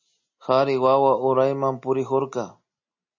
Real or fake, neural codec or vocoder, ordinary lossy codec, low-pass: real; none; MP3, 32 kbps; 7.2 kHz